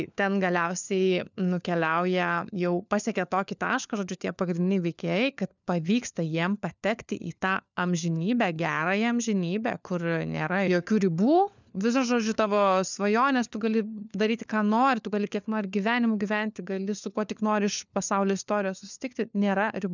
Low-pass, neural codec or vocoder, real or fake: 7.2 kHz; codec, 16 kHz, 4 kbps, FunCodec, trained on LibriTTS, 50 frames a second; fake